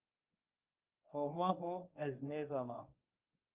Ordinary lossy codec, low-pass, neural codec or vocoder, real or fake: Opus, 24 kbps; 3.6 kHz; codec, 24 kHz, 0.9 kbps, WavTokenizer, medium speech release version 1; fake